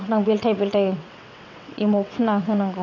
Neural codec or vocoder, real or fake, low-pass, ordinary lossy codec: vocoder, 22.05 kHz, 80 mel bands, WaveNeXt; fake; 7.2 kHz; none